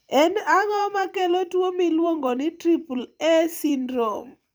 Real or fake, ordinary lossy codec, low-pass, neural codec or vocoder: fake; none; none; vocoder, 44.1 kHz, 128 mel bands every 256 samples, BigVGAN v2